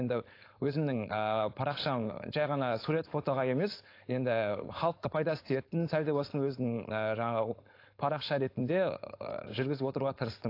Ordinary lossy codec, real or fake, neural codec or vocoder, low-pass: AAC, 32 kbps; fake; codec, 16 kHz, 4.8 kbps, FACodec; 5.4 kHz